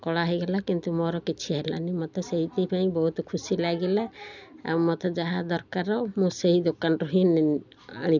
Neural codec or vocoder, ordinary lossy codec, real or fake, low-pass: none; none; real; 7.2 kHz